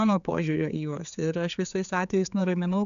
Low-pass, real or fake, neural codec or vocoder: 7.2 kHz; fake; codec, 16 kHz, 4 kbps, X-Codec, HuBERT features, trained on general audio